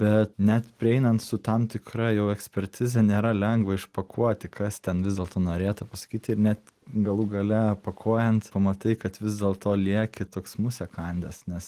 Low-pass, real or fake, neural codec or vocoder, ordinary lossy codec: 14.4 kHz; real; none; Opus, 24 kbps